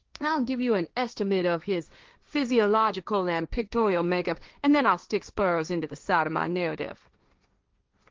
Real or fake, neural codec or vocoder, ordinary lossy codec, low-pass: fake; codec, 16 kHz, 1.1 kbps, Voila-Tokenizer; Opus, 32 kbps; 7.2 kHz